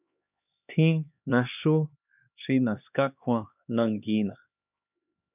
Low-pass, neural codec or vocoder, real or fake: 3.6 kHz; codec, 16 kHz, 2 kbps, X-Codec, HuBERT features, trained on LibriSpeech; fake